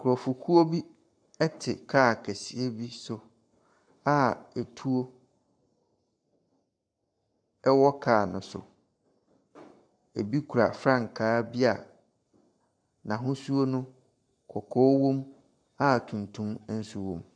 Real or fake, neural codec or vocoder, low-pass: fake; codec, 44.1 kHz, 7.8 kbps, Pupu-Codec; 9.9 kHz